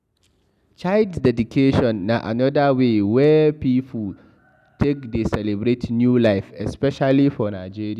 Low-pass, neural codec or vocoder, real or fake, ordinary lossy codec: 14.4 kHz; none; real; none